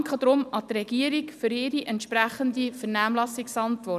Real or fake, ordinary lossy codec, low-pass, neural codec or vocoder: real; none; 14.4 kHz; none